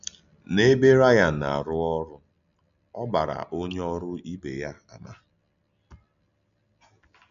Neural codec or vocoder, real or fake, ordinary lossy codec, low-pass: none; real; none; 7.2 kHz